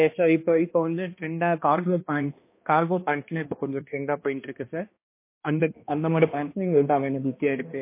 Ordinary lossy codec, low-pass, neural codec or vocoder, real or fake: MP3, 24 kbps; 3.6 kHz; codec, 16 kHz, 1 kbps, X-Codec, HuBERT features, trained on balanced general audio; fake